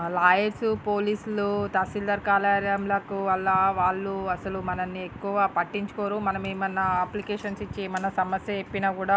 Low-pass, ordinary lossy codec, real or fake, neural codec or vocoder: none; none; real; none